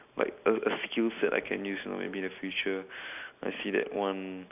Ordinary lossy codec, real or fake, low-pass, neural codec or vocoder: none; real; 3.6 kHz; none